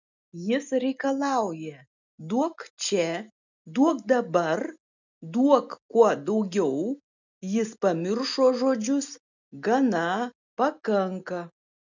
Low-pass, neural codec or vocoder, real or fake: 7.2 kHz; none; real